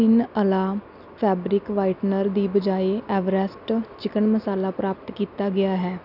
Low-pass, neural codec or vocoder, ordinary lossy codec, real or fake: 5.4 kHz; none; none; real